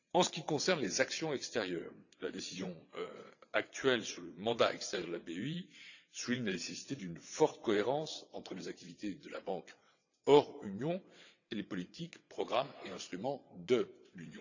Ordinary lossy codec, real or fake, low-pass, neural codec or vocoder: none; fake; 7.2 kHz; vocoder, 22.05 kHz, 80 mel bands, WaveNeXt